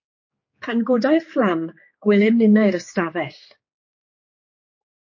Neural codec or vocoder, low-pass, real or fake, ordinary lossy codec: codec, 16 kHz, 4 kbps, X-Codec, HuBERT features, trained on general audio; 7.2 kHz; fake; MP3, 32 kbps